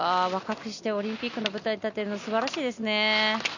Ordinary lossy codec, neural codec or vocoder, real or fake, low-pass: none; none; real; 7.2 kHz